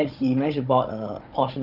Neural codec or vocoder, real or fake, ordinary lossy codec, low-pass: codec, 16 kHz, 16 kbps, FunCodec, trained on Chinese and English, 50 frames a second; fake; Opus, 24 kbps; 5.4 kHz